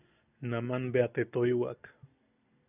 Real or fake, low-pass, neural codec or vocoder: real; 3.6 kHz; none